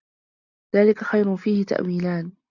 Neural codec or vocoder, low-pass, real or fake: none; 7.2 kHz; real